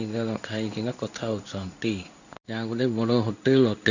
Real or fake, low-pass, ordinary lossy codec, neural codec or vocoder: fake; 7.2 kHz; none; codec, 16 kHz in and 24 kHz out, 1 kbps, XY-Tokenizer